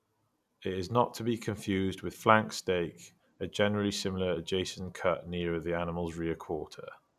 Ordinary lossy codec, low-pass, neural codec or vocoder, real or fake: none; 14.4 kHz; none; real